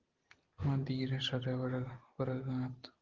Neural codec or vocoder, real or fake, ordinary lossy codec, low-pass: none; real; Opus, 16 kbps; 7.2 kHz